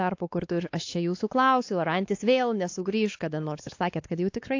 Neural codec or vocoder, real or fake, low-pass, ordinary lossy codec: codec, 16 kHz, 2 kbps, X-Codec, WavLM features, trained on Multilingual LibriSpeech; fake; 7.2 kHz; AAC, 48 kbps